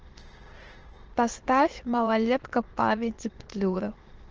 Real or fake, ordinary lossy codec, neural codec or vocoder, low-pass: fake; Opus, 16 kbps; autoencoder, 22.05 kHz, a latent of 192 numbers a frame, VITS, trained on many speakers; 7.2 kHz